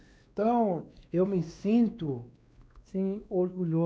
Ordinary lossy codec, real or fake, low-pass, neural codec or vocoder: none; fake; none; codec, 16 kHz, 2 kbps, X-Codec, WavLM features, trained on Multilingual LibriSpeech